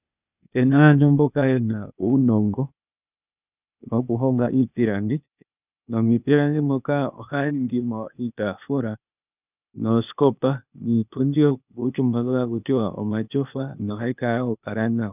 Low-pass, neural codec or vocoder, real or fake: 3.6 kHz; codec, 16 kHz, 0.8 kbps, ZipCodec; fake